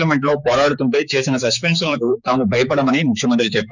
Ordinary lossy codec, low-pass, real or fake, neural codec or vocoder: none; 7.2 kHz; fake; codec, 16 kHz, 4 kbps, X-Codec, HuBERT features, trained on general audio